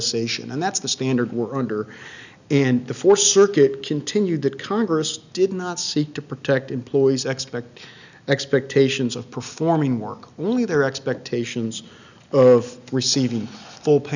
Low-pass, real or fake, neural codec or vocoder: 7.2 kHz; real; none